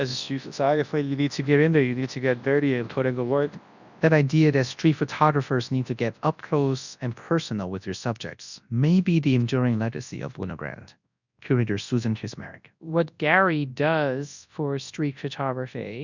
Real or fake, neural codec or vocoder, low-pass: fake; codec, 24 kHz, 0.9 kbps, WavTokenizer, large speech release; 7.2 kHz